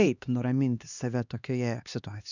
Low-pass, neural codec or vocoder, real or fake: 7.2 kHz; codec, 16 kHz, 2 kbps, X-Codec, HuBERT features, trained on LibriSpeech; fake